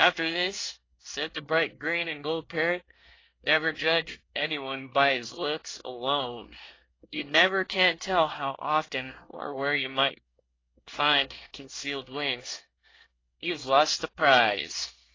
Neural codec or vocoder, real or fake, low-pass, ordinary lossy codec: codec, 24 kHz, 1 kbps, SNAC; fake; 7.2 kHz; AAC, 32 kbps